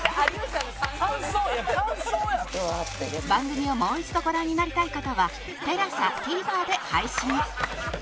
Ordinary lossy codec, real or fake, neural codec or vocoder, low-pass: none; real; none; none